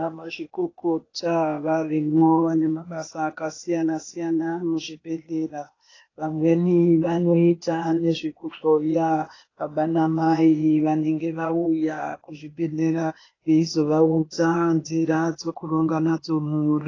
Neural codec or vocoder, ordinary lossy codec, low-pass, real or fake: codec, 16 kHz, 0.8 kbps, ZipCodec; AAC, 32 kbps; 7.2 kHz; fake